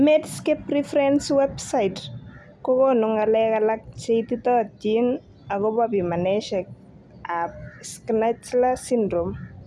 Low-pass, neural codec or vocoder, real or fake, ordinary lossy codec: none; none; real; none